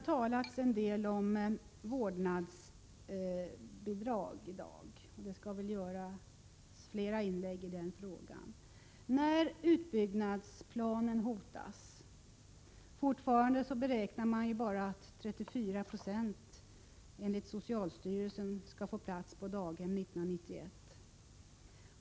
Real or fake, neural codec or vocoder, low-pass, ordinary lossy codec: real; none; none; none